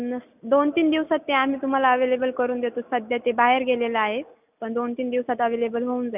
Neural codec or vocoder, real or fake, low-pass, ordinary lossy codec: none; real; 3.6 kHz; none